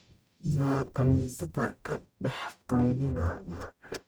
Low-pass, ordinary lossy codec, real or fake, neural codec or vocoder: none; none; fake; codec, 44.1 kHz, 0.9 kbps, DAC